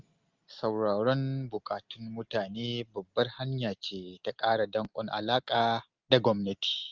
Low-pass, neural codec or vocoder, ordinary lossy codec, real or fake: 7.2 kHz; none; Opus, 24 kbps; real